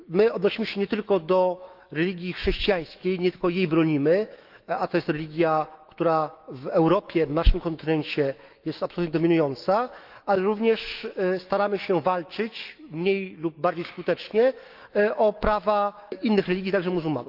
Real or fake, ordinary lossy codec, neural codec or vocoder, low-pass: fake; Opus, 32 kbps; autoencoder, 48 kHz, 128 numbers a frame, DAC-VAE, trained on Japanese speech; 5.4 kHz